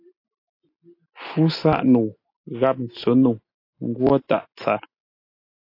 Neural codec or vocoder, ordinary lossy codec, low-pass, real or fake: none; AAC, 32 kbps; 5.4 kHz; real